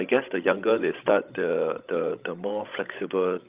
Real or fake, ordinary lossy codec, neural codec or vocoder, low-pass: fake; Opus, 24 kbps; codec, 16 kHz, 16 kbps, FreqCodec, larger model; 3.6 kHz